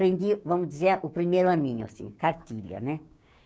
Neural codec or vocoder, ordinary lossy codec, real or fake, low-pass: codec, 16 kHz, 6 kbps, DAC; none; fake; none